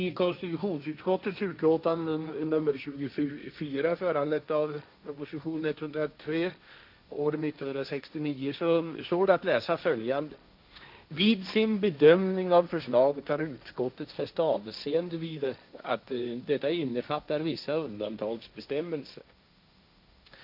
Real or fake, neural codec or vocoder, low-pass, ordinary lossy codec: fake; codec, 16 kHz, 1.1 kbps, Voila-Tokenizer; 5.4 kHz; none